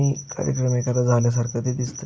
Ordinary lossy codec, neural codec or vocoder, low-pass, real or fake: none; none; none; real